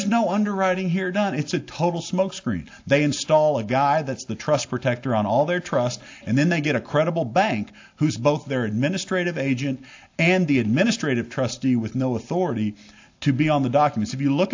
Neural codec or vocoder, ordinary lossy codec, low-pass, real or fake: none; AAC, 48 kbps; 7.2 kHz; real